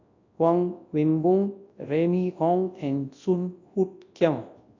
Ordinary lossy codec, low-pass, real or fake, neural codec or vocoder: AAC, 48 kbps; 7.2 kHz; fake; codec, 24 kHz, 0.9 kbps, WavTokenizer, large speech release